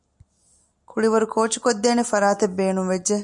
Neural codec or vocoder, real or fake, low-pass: none; real; 10.8 kHz